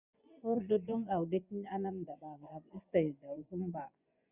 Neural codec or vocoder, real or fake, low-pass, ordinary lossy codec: vocoder, 24 kHz, 100 mel bands, Vocos; fake; 3.6 kHz; Opus, 64 kbps